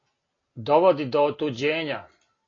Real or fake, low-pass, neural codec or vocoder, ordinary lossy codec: real; 7.2 kHz; none; AAC, 32 kbps